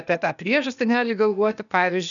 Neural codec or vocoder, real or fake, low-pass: codec, 16 kHz, 0.8 kbps, ZipCodec; fake; 7.2 kHz